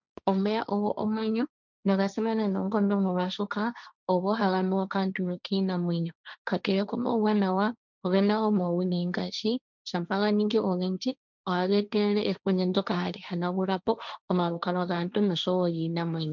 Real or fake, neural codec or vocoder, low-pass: fake; codec, 16 kHz, 1.1 kbps, Voila-Tokenizer; 7.2 kHz